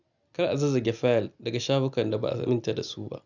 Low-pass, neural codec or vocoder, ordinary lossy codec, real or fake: 7.2 kHz; none; none; real